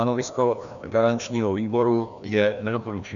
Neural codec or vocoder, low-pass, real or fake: codec, 16 kHz, 1 kbps, FreqCodec, larger model; 7.2 kHz; fake